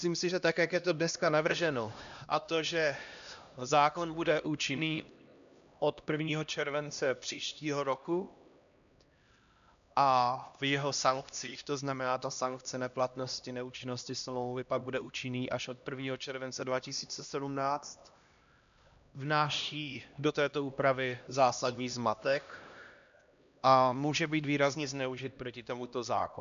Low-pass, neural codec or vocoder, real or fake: 7.2 kHz; codec, 16 kHz, 1 kbps, X-Codec, HuBERT features, trained on LibriSpeech; fake